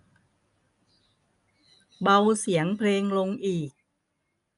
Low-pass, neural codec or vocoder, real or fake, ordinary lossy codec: 10.8 kHz; none; real; none